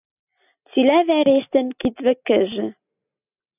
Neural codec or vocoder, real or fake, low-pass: none; real; 3.6 kHz